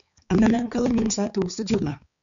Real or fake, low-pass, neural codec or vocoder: fake; 7.2 kHz; codec, 16 kHz, 4 kbps, X-Codec, HuBERT features, trained on balanced general audio